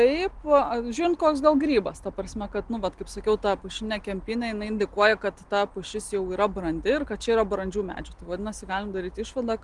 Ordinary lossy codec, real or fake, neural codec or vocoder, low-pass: Opus, 24 kbps; real; none; 10.8 kHz